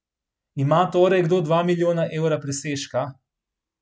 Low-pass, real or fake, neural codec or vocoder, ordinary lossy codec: none; real; none; none